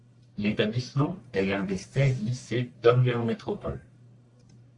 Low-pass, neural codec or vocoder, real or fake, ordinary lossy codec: 10.8 kHz; codec, 44.1 kHz, 1.7 kbps, Pupu-Codec; fake; AAC, 64 kbps